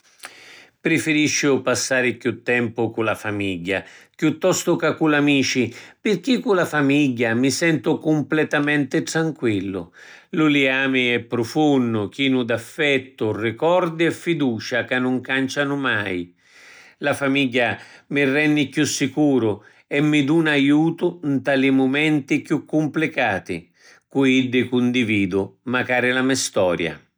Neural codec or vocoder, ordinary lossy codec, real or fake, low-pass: none; none; real; none